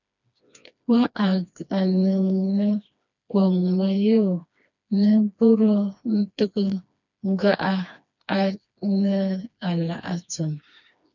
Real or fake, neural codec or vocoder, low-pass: fake; codec, 16 kHz, 2 kbps, FreqCodec, smaller model; 7.2 kHz